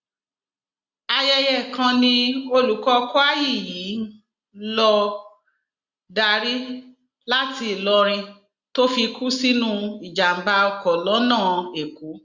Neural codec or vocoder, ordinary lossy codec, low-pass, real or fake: none; Opus, 64 kbps; 7.2 kHz; real